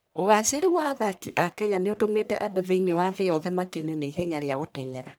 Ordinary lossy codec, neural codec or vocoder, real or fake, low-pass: none; codec, 44.1 kHz, 1.7 kbps, Pupu-Codec; fake; none